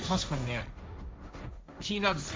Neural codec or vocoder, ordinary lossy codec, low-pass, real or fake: codec, 16 kHz, 1.1 kbps, Voila-Tokenizer; none; none; fake